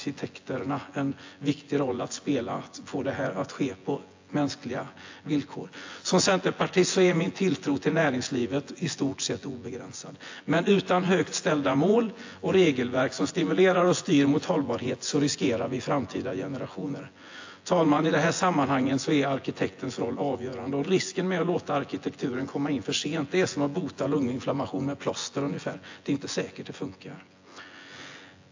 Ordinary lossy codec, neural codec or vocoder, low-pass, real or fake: AAC, 48 kbps; vocoder, 24 kHz, 100 mel bands, Vocos; 7.2 kHz; fake